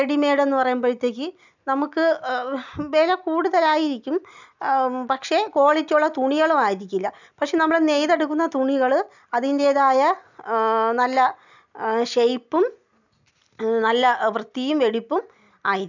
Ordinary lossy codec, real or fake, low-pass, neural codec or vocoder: none; real; 7.2 kHz; none